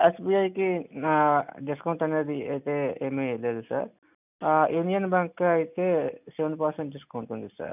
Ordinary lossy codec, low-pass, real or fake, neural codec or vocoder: none; 3.6 kHz; real; none